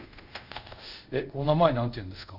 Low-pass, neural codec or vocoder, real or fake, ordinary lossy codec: 5.4 kHz; codec, 24 kHz, 0.5 kbps, DualCodec; fake; none